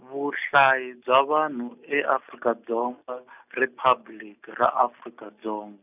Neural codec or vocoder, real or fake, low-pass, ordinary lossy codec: none; real; 3.6 kHz; none